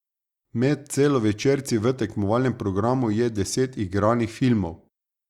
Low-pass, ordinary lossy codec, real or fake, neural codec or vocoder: 19.8 kHz; Opus, 64 kbps; real; none